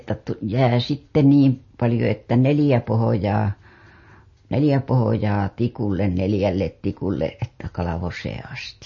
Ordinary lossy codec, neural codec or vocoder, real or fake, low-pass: MP3, 32 kbps; none; real; 7.2 kHz